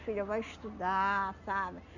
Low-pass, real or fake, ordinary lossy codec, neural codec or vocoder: 7.2 kHz; real; none; none